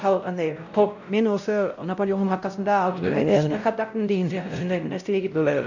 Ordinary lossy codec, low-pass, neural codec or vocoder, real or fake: none; 7.2 kHz; codec, 16 kHz, 0.5 kbps, X-Codec, WavLM features, trained on Multilingual LibriSpeech; fake